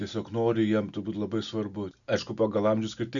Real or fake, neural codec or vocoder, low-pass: real; none; 7.2 kHz